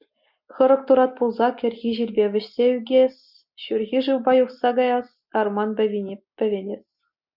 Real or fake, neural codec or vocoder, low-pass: real; none; 5.4 kHz